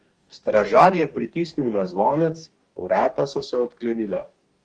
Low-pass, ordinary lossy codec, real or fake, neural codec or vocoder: 9.9 kHz; Opus, 24 kbps; fake; codec, 44.1 kHz, 2.6 kbps, DAC